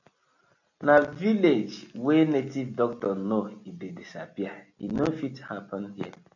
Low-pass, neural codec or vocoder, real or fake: 7.2 kHz; none; real